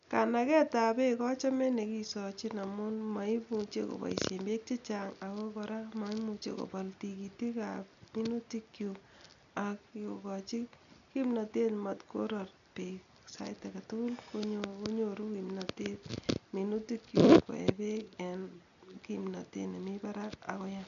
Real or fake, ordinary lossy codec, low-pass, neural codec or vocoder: real; none; 7.2 kHz; none